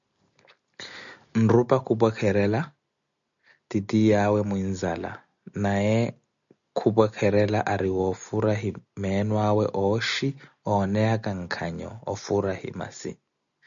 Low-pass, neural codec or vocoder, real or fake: 7.2 kHz; none; real